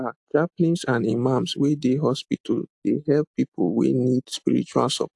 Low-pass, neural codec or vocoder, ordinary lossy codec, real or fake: 10.8 kHz; none; AAC, 64 kbps; real